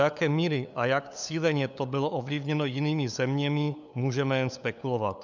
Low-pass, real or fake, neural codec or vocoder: 7.2 kHz; fake; codec, 16 kHz, 8 kbps, FunCodec, trained on LibriTTS, 25 frames a second